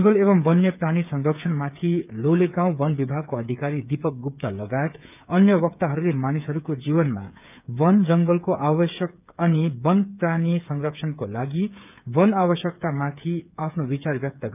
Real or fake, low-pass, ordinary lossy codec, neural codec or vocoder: fake; 3.6 kHz; none; codec, 16 kHz, 8 kbps, FreqCodec, smaller model